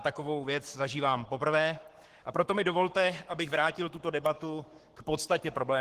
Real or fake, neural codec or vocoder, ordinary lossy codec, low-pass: fake; codec, 44.1 kHz, 7.8 kbps, Pupu-Codec; Opus, 16 kbps; 14.4 kHz